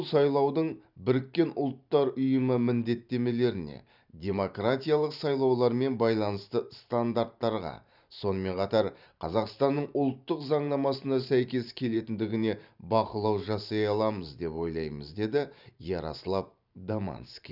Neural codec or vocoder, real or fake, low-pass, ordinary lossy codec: vocoder, 44.1 kHz, 128 mel bands every 512 samples, BigVGAN v2; fake; 5.4 kHz; none